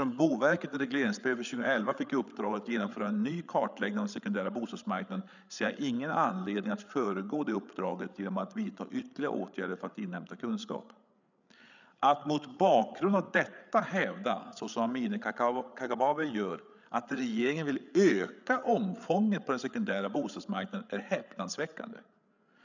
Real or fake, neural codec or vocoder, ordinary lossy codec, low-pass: fake; codec, 16 kHz, 8 kbps, FreqCodec, larger model; none; 7.2 kHz